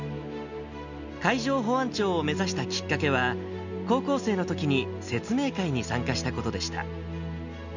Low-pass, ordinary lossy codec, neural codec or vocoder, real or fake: 7.2 kHz; none; none; real